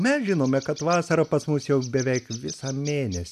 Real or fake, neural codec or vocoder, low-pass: real; none; 14.4 kHz